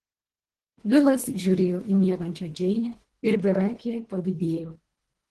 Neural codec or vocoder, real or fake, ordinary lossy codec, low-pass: codec, 24 kHz, 1.5 kbps, HILCodec; fake; Opus, 16 kbps; 10.8 kHz